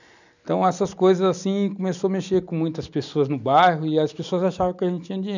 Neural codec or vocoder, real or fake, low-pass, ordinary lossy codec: none; real; 7.2 kHz; none